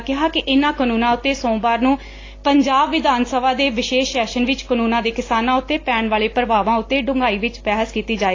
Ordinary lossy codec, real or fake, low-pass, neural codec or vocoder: AAC, 32 kbps; real; 7.2 kHz; none